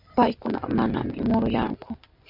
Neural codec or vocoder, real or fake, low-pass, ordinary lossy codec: none; real; 5.4 kHz; MP3, 48 kbps